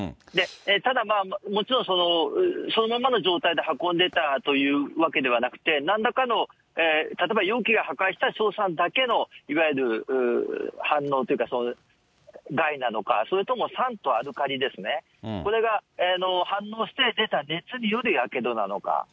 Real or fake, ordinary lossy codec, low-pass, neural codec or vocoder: real; none; none; none